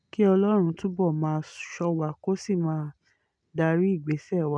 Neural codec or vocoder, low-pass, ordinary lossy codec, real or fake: none; 9.9 kHz; none; real